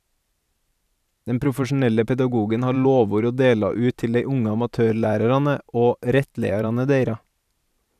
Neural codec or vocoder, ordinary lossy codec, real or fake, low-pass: vocoder, 44.1 kHz, 128 mel bands every 512 samples, BigVGAN v2; none; fake; 14.4 kHz